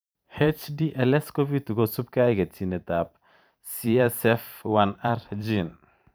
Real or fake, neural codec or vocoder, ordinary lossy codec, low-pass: fake; vocoder, 44.1 kHz, 128 mel bands every 512 samples, BigVGAN v2; none; none